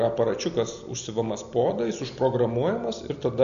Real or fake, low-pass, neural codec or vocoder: real; 7.2 kHz; none